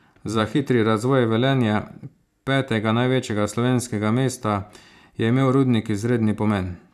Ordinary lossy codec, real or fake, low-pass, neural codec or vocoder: none; fake; 14.4 kHz; vocoder, 44.1 kHz, 128 mel bands every 512 samples, BigVGAN v2